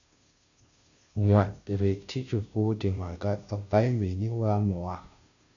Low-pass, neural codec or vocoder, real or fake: 7.2 kHz; codec, 16 kHz, 0.5 kbps, FunCodec, trained on Chinese and English, 25 frames a second; fake